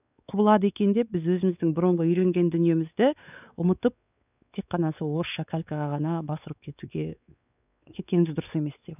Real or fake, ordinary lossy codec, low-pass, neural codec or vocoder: fake; none; 3.6 kHz; codec, 16 kHz, 4 kbps, X-Codec, WavLM features, trained on Multilingual LibriSpeech